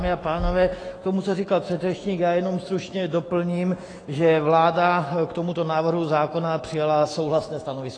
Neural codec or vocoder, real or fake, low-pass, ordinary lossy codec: autoencoder, 48 kHz, 128 numbers a frame, DAC-VAE, trained on Japanese speech; fake; 9.9 kHz; AAC, 32 kbps